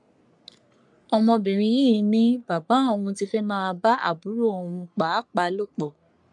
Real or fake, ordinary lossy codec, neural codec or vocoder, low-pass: fake; none; codec, 44.1 kHz, 3.4 kbps, Pupu-Codec; 10.8 kHz